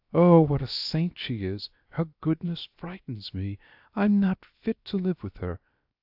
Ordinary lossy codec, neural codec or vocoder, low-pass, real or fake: AAC, 48 kbps; codec, 16 kHz, 0.7 kbps, FocalCodec; 5.4 kHz; fake